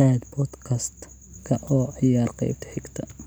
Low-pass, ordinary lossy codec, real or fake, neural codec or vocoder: none; none; real; none